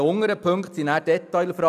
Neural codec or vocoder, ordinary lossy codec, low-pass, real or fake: none; none; 14.4 kHz; real